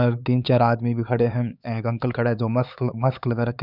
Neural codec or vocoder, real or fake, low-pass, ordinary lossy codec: codec, 16 kHz, 4 kbps, X-Codec, HuBERT features, trained on LibriSpeech; fake; 5.4 kHz; none